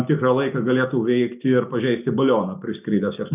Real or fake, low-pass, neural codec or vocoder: real; 3.6 kHz; none